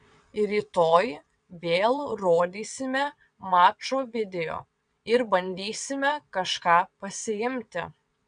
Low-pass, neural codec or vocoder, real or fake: 9.9 kHz; vocoder, 22.05 kHz, 80 mel bands, WaveNeXt; fake